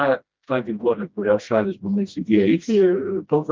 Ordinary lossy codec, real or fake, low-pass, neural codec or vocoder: Opus, 24 kbps; fake; 7.2 kHz; codec, 16 kHz, 1 kbps, FreqCodec, smaller model